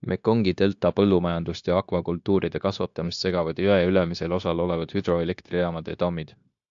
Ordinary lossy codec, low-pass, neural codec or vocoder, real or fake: AAC, 64 kbps; 7.2 kHz; codec, 16 kHz, 0.9 kbps, LongCat-Audio-Codec; fake